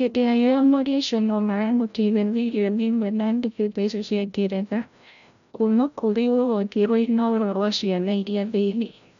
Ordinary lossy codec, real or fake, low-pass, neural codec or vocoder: none; fake; 7.2 kHz; codec, 16 kHz, 0.5 kbps, FreqCodec, larger model